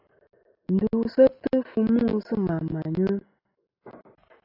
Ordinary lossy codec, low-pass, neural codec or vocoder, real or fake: AAC, 32 kbps; 5.4 kHz; none; real